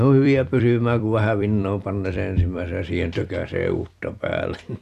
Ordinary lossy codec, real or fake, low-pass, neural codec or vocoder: none; fake; 14.4 kHz; vocoder, 44.1 kHz, 128 mel bands every 256 samples, BigVGAN v2